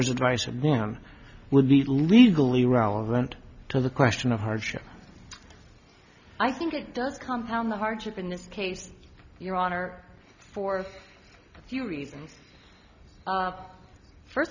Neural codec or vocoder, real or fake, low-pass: none; real; 7.2 kHz